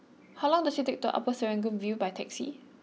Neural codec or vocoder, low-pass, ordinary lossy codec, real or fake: none; none; none; real